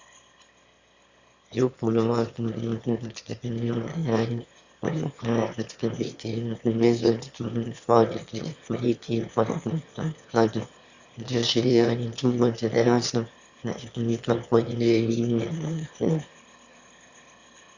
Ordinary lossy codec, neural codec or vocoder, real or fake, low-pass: Opus, 64 kbps; autoencoder, 22.05 kHz, a latent of 192 numbers a frame, VITS, trained on one speaker; fake; 7.2 kHz